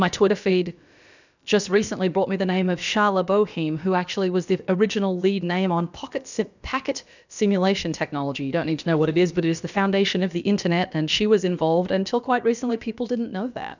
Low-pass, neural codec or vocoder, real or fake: 7.2 kHz; codec, 16 kHz, about 1 kbps, DyCAST, with the encoder's durations; fake